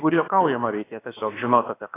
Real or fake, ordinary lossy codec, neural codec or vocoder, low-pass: fake; AAC, 16 kbps; codec, 16 kHz, about 1 kbps, DyCAST, with the encoder's durations; 3.6 kHz